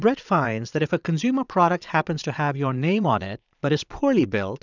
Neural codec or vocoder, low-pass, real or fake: vocoder, 22.05 kHz, 80 mel bands, Vocos; 7.2 kHz; fake